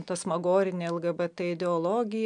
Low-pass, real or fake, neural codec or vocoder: 9.9 kHz; real; none